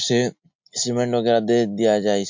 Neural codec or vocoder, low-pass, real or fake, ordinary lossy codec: none; 7.2 kHz; real; MP3, 48 kbps